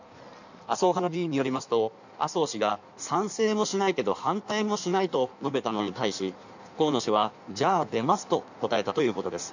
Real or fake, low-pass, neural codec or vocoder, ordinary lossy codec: fake; 7.2 kHz; codec, 16 kHz in and 24 kHz out, 1.1 kbps, FireRedTTS-2 codec; none